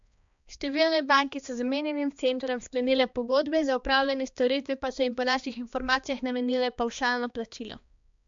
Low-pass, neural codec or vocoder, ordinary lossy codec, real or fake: 7.2 kHz; codec, 16 kHz, 2 kbps, X-Codec, HuBERT features, trained on balanced general audio; MP3, 64 kbps; fake